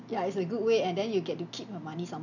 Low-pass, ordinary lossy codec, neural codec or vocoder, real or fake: 7.2 kHz; none; none; real